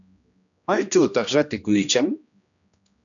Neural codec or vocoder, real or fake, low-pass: codec, 16 kHz, 1 kbps, X-Codec, HuBERT features, trained on general audio; fake; 7.2 kHz